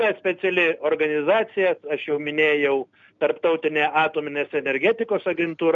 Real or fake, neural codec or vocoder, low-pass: real; none; 7.2 kHz